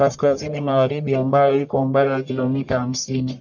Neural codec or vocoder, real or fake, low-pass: codec, 44.1 kHz, 1.7 kbps, Pupu-Codec; fake; 7.2 kHz